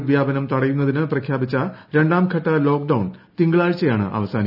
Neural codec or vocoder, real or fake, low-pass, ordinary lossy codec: none; real; 5.4 kHz; none